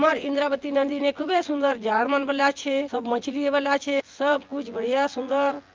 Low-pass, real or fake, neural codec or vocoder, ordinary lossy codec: 7.2 kHz; fake; vocoder, 24 kHz, 100 mel bands, Vocos; Opus, 32 kbps